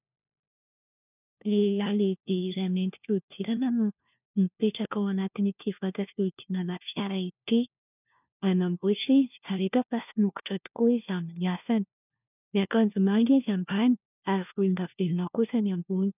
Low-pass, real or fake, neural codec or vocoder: 3.6 kHz; fake; codec, 16 kHz, 1 kbps, FunCodec, trained on LibriTTS, 50 frames a second